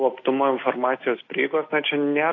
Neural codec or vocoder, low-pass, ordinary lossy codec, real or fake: none; 7.2 kHz; AAC, 32 kbps; real